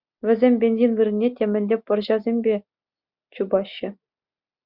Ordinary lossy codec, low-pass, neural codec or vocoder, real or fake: Opus, 64 kbps; 5.4 kHz; none; real